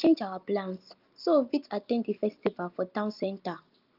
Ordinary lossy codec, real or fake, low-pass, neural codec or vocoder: Opus, 24 kbps; real; 5.4 kHz; none